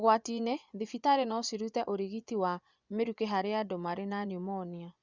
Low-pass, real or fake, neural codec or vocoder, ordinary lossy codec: 7.2 kHz; fake; vocoder, 24 kHz, 100 mel bands, Vocos; Opus, 64 kbps